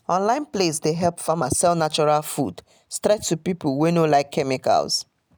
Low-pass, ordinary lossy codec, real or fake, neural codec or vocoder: none; none; real; none